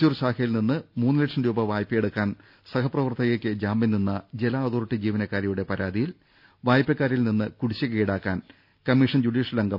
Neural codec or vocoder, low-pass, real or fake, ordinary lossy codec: none; 5.4 kHz; real; none